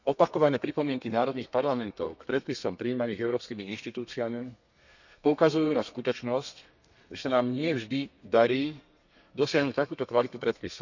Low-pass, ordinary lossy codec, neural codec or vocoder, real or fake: 7.2 kHz; none; codec, 32 kHz, 1.9 kbps, SNAC; fake